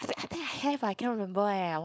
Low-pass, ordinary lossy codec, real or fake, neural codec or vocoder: none; none; fake; codec, 16 kHz, 4.8 kbps, FACodec